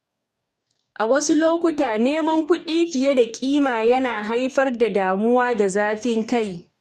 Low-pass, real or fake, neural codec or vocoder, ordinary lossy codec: 14.4 kHz; fake; codec, 44.1 kHz, 2.6 kbps, DAC; none